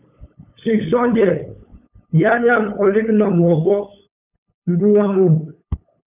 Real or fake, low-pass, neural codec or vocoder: fake; 3.6 kHz; codec, 16 kHz, 8 kbps, FunCodec, trained on LibriTTS, 25 frames a second